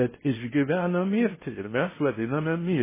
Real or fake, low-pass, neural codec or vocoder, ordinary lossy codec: fake; 3.6 kHz; codec, 16 kHz in and 24 kHz out, 0.6 kbps, FocalCodec, streaming, 2048 codes; MP3, 16 kbps